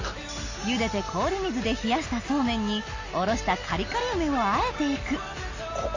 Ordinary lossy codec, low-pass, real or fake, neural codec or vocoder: MP3, 32 kbps; 7.2 kHz; real; none